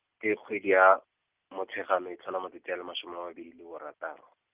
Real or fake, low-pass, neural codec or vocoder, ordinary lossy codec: real; 3.6 kHz; none; Opus, 24 kbps